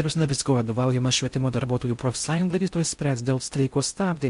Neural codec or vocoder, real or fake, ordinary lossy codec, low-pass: codec, 16 kHz in and 24 kHz out, 0.6 kbps, FocalCodec, streaming, 4096 codes; fake; MP3, 64 kbps; 10.8 kHz